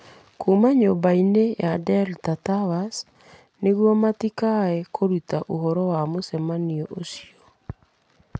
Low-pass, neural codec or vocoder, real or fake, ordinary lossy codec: none; none; real; none